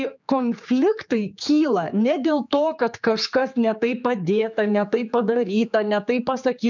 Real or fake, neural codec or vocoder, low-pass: fake; codec, 16 kHz, 4 kbps, X-Codec, HuBERT features, trained on general audio; 7.2 kHz